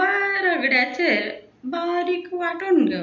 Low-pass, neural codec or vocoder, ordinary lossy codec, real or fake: 7.2 kHz; none; MP3, 64 kbps; real